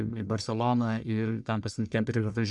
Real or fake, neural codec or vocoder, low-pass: fake; codec, 44.1 kHz, 1.7 kbps, Pupu-Codec; 10.8 kHz